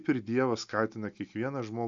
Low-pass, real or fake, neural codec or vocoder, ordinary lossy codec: 7.2 kHz; real; none; AAC, 64 kbps